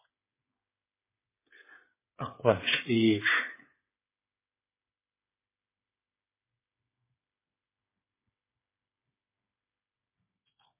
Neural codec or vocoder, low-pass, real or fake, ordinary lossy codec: codec, 16 kHz, 8 kbps, FreqCodec, smaller model; 3.6 kHz; fake; MP3, 16 kbps